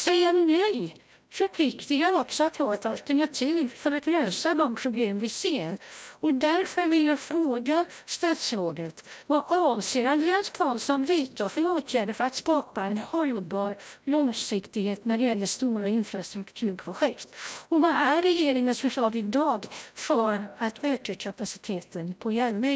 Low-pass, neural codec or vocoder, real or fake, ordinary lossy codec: none; codec, 16 kHz, 0.5 kbps, FreqCodec, larger model; fake; none